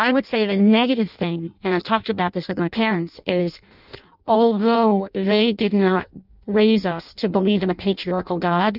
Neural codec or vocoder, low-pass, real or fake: codec, 16 kHz in and 24 kHz out, 0.6 kbps, FireRedTTS-2 codec; 5.4 kHz; fake